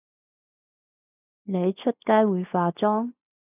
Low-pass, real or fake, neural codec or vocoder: 3.6 kHz; real; none